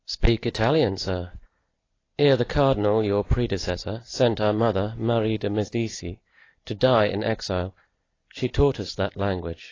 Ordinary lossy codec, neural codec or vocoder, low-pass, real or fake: AAC, 32 kbps; none; 7.2 kHz; real